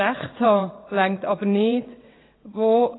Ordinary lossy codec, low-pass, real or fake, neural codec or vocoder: AAC, 16 kbps; 7.2 kHz; fake; vocoder, 22.05 kHz, 80 mel bands, WaveNeXt